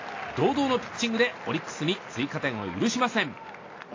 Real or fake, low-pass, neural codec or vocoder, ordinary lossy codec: real; 7.2 kHz; none; AAC, 32 kbps